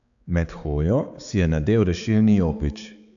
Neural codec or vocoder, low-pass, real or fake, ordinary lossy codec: codec, 16 kHz, 4 kbps, X-Codec, HuBERT features, trained on balanced general audio; 7.2 kHz; fake; none